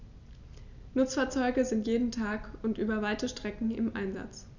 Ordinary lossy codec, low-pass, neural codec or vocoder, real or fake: none; 7.2 kHz; none; real